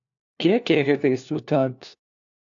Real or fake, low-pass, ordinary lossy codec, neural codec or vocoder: fake; 7.2 kHz; AAC, 64 kbps; codec, 16 kHz, 1 kbps, FunCodec, trained on LibriTTS, 50 frames a second